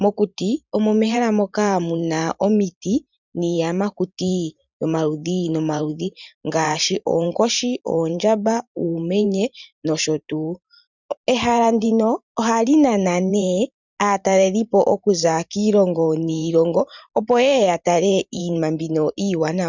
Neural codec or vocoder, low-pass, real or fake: vocoder, 44.1 kHz, 128 mel bands every 512 samples, BigVGAN v2; 7.2 kHz; fake